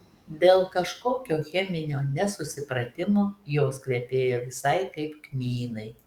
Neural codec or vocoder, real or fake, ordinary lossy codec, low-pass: codec, 44.1 kHz, 7.8 kbps, DAC; fake; Opus, 64 kbps; 19.8 kHz